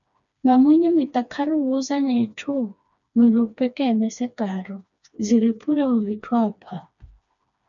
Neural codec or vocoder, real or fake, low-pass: codec, 16 kHz, 2 kbps, FreqCodec, smaller model; fake; 7.2 kHz